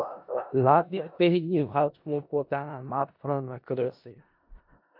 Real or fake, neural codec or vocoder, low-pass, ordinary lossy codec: fake; codec, 16 kHz in and 24 kHz out, 0.4 kbps, LongCat-Audio-Codec, four codebook decoder; 5.4 kHz; AAC, 48 kbps